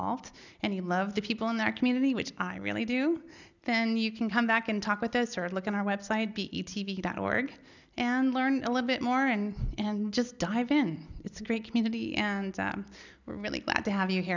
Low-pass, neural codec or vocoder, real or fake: 7.2 kHz; none; real